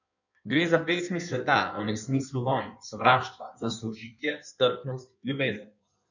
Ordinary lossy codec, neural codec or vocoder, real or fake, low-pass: none; codec, 16 kHz in and 24 kHz out, 1.1 kbps, FireRedTTS-2 codec; fake; 7.2 kHz